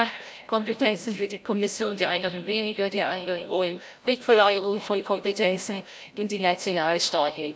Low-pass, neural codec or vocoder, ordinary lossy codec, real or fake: none; codec, 16 kHz, 0.5 kbps, FreqCodec, larger model; none; fake